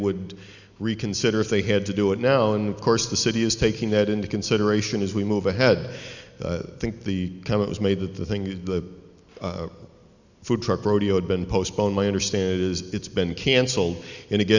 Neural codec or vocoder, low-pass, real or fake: none; 7.2 kHz; real